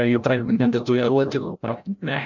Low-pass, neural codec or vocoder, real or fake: 7.2 kHz; codec, 16 kHz, 0.5 kbps, FreqCodec, larger model; fake